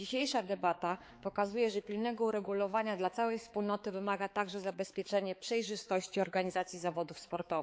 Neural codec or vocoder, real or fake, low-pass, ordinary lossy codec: codec, 16 kHz, 4 kbps, X-Codec, HuBERT features, trained on balanced general audio; fake; none; none